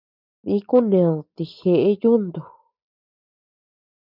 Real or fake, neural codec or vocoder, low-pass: real; none; 5.4 kHz